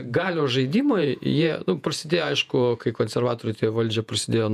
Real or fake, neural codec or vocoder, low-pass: fake; vocoder, 48 kHz, 128 mel bands, Vocos; 14.4 kHz